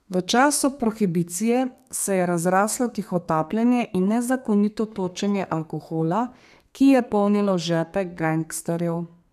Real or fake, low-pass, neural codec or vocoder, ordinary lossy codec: fake; 14.4 kHz; codec, 32 kHz, 1.9 kbps, SNAC; none